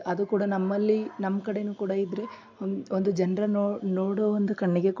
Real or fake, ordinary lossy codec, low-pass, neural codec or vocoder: real; AAC, 48 kbps; 7.2 kHz; none